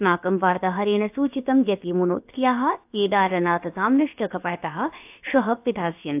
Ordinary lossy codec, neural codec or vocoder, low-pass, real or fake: AAC, 32 kbps; codec, 16 kHz, about 1 kbps, DyCAST, with the encoder's durations; 3.6 kHz; fake